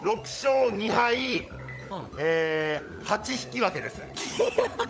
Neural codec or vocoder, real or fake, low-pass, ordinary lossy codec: codec, 16 kHz, 16 kbps, FunCodec, trained on LibriTTS, 50 frames a second; fake; none; none